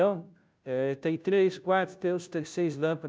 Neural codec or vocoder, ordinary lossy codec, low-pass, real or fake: codec, 16 kHz, 0.5 kbps, FunCodec, trained on Chinese and English, 25 frames a second; none; none; fake